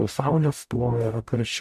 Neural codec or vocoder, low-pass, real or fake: codec, 44.1 kHz, 0.9 kbps, DAC; 14.4 kHz; fake